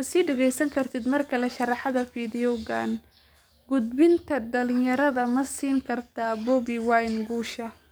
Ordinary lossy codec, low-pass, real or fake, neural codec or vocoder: none; none; fake; codec, 44.1 kHz, 7.8 kbps, DAC